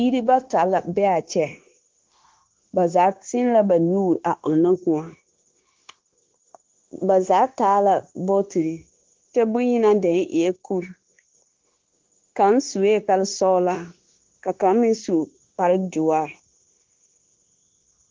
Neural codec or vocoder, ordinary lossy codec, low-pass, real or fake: codec, 16 kHz, 0.9 kbps, LongCat-Audio-Codec; Opus, 16 kbps; 7.2 kHz; fake